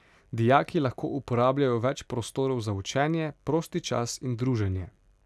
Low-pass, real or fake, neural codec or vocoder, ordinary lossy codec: none; real; none; none